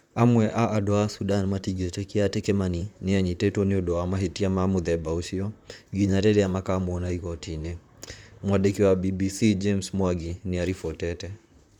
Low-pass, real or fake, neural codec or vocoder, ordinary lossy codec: 19.8 kHz; fake; vocoder, 44.1 kHz, 128 mel bands, Pupu-Vocoder; none